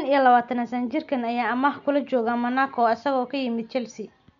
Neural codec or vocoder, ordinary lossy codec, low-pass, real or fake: none; none; 7.2 kHz; real